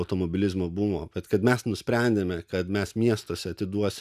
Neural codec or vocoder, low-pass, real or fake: none; 14.4 kHz; real